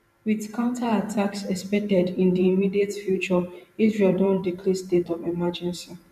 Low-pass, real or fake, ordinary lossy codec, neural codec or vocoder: 14.4 kHz; fake; none; vocoder, 44.1 kHz, 128 mel bands every 512 samples, BigVGAN v2